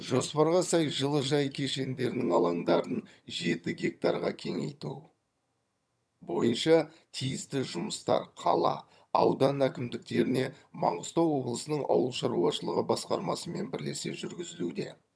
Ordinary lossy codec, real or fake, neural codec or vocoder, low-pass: none; fake; vocoder, 22.05 kHz, 80 mel bands, HiFi-GAN; none